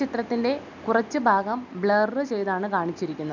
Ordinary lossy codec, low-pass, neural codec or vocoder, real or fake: none; 7.2 kHz; none; real